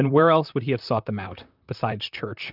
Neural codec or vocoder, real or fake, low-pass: vocoder, 44.1 kHz, 128 mel bands, Pupu-Vocoder; fake; 5.4 kHz